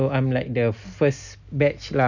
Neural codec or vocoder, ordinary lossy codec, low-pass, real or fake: none; none; 7.2 kHz; real